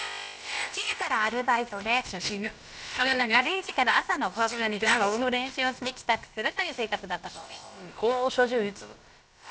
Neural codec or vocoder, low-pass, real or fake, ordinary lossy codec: codec, 16 kHz, about 1 kbps, DyCAST, with the encoder's durations; none; fake; none